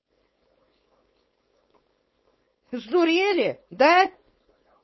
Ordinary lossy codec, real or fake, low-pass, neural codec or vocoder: MP3, 24 kbps; fake; 7.2 kHz; codec, 16 kHz, 4.8 kbps, FACodec